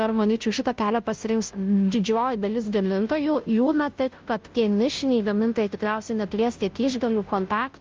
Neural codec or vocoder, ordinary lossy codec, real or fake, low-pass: codec, 16 kHz, 0.5 kbps, FunCodec, trained on Chinese and English, 25 frames a second; Opus, 24 kbps; fake; 7.2 kHz